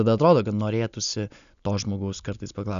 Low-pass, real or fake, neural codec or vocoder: 7.2 kHz; real; none